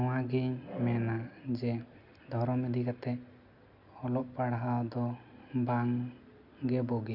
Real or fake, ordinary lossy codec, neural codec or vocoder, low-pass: real; none; none; 5.4 kHz